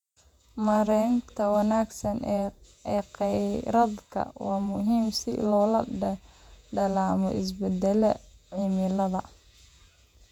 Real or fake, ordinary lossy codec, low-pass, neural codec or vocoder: fake; none; 19.8 kHz; vocoder, 48 kHz, 128 mel bands, Vocos